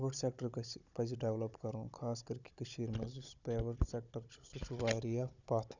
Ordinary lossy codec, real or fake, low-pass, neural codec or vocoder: none; fake; 7.2 kHz; codec, 16 kHz, 16 kbps, FunCodec, trained on Chinese and English, 50 frames a second